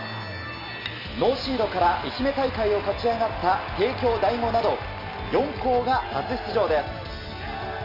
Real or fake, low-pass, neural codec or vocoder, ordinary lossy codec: real; 5.4 kHz; none; none